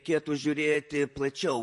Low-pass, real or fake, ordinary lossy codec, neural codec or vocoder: 14.4 kHz; fake; MP3, 48 kbps; vocoder, 44.1 kHz, 128 mel bands, Pupu-Vocoder